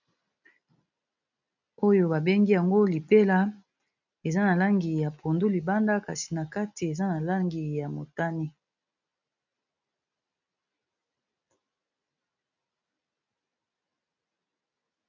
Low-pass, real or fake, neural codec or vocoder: 7.2 kHz; real; none